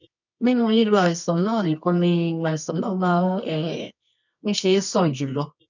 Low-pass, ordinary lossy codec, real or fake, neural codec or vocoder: 7.2 kHz; none; fake; codec, 24 kHz, 0.9 kbps, WavTokenizer, medium music audio release